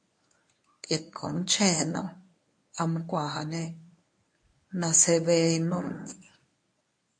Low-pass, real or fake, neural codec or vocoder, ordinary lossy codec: 9.9 kHz; fake; codec, 24 kHz, 0.9 kbps, WavTokenizer, medium speech release version 1; MP3, 48 kbps